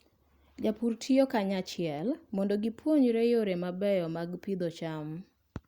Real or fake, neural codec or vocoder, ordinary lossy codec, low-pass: real; none; Opus, 64 kbps; 19.8 kHz